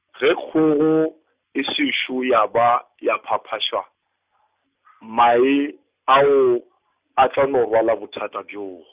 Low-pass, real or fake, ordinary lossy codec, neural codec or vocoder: 3.6 kHz; real; Opus, 64 kbps; none